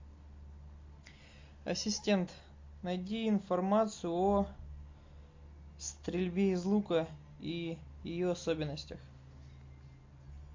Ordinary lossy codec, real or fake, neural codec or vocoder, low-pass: MP3, 48 kbps; real; none; 7.2 kHz